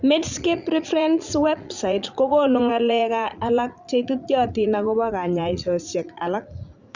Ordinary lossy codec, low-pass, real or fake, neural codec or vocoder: Opus, 64 kbps; 7.2 kHz; fake; vocoder, 44.1 kHz, 80 mel bands, Vocos